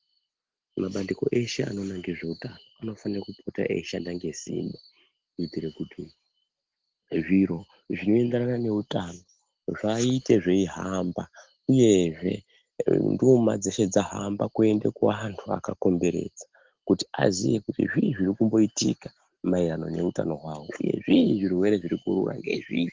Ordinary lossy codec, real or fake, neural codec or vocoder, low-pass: Opus, 16 kbps; real; none; 7.2 kHz